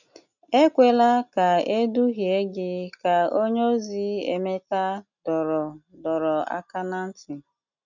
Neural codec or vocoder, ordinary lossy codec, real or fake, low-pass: none; none; real; 7.2 kHz